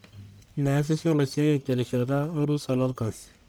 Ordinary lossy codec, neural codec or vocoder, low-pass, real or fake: none; codec, 44.1 kHz, 1.7 kbps, Pupu-Codec; none; fake